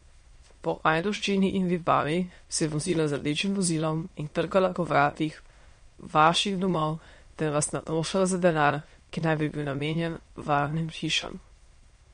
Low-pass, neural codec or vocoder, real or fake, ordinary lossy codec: 9.9 kHz; autoencoder, 22.05 kHz, a latent of 192 numbers a frame, VITS, trained on many speakers; fake; MP3, 48 kbps